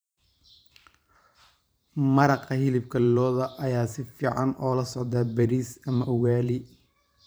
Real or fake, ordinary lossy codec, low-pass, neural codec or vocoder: fake; none; none; vocoder, 44.1 kHz, 128 mel bands every 512 samples, BigVGAN v2